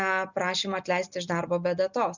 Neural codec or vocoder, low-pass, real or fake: none; 7.2 kHz; real